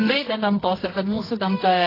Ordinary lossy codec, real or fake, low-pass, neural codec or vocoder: AAC, 24 kbps; fake; 5.4 kHz; codec, 24 kHz, 0.9 kbps, WavTokenizer, medium music audio release